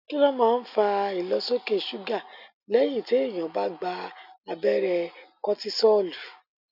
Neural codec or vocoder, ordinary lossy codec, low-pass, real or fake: none; none; 5.4 kHz; real